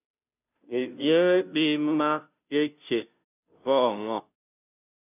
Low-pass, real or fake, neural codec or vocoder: 3.6 kHz; fake; codec, 16 kHz, 0.5 kbps, FunCodec, trained on Chinese and English, 25 frames a second